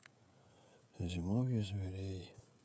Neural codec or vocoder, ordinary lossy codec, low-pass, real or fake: codec, 16 kHz, 16 kbps, FreqCodec, smaller model; none; none; fake